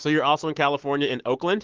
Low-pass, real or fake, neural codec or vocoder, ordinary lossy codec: 7.2 kHz; fake; autoencoder, 48 kHz, 128 numbers a frame, DAC-VAE, trained on Japanese speech; Opus, 16 kbps